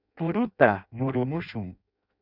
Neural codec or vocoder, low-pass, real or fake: codec, 16 kHz in and 24 kHz out, 0.6 kbps, FireRedTTS-2 codec; 5.4 kHz; fake